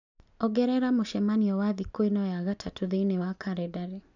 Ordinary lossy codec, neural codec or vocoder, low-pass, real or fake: none; none; 7.2 kHz; real